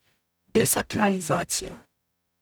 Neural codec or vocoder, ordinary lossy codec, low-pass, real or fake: codec, 44.1 kHz, 0.9 kbps, DAC; none; none; fake